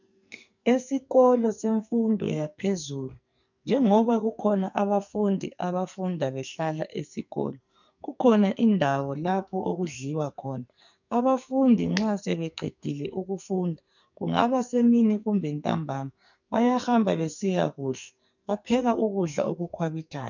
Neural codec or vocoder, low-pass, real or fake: codec, 44.1 kHz, 2.6 kbps, SNAC; 7.2 kHz; fake